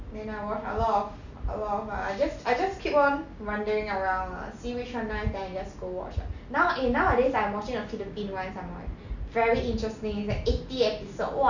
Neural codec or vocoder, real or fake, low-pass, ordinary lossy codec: none; real; 7.2 kHz; none